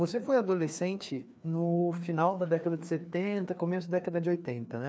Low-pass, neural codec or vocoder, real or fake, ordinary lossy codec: none; codec, 16 kHz, 2 kbps, FreqCodec, larger model; fake; none